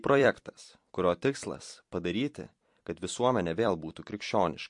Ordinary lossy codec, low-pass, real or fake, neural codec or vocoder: MP3, 48 kbps; 10.8 kHz; fake; vocoder, 44.1 kHz, 128 mel bands every 256 samples, BigVGAN v2